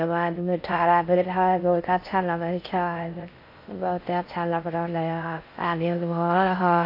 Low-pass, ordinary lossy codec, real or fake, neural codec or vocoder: 5.4 kHz; AAC, 32 kbps; fake; codec, 16 kHz in and 24 kHz out, 0.6 kbps, FocalCodec, streaming, 2048 codes